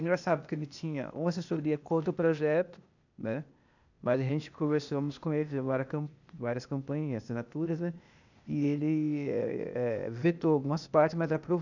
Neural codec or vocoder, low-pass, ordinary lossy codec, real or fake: codec, 16 kHz, 0.8 kbps, ZipCodec; 7.2 kHz; none; fake